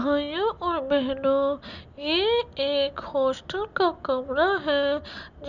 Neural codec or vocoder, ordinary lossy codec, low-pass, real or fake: none; Opus, 64 kbps; 7.2 kHz; real